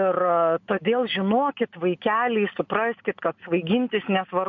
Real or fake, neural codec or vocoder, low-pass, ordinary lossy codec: real; none; 7.2 kHz; MP3, 48 kbps